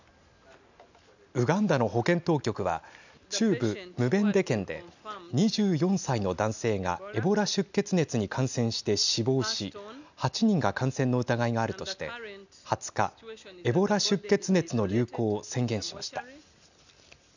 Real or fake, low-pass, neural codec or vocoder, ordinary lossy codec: real; 7.2 kHz; none; none